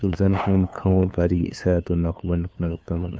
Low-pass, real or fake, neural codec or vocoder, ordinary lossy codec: none; fake; codec, 16 kHz, 2 kbps, FunCodec, trained on LibriTTS, 25 frames a second; none